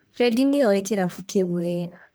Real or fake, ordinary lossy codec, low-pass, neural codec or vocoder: fake; none; none; codec, 44.1 kHz, 1.7 kbps, Pupu-Codec